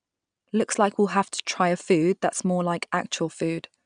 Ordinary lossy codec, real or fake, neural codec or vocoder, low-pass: none; real; none; 9.9 kHz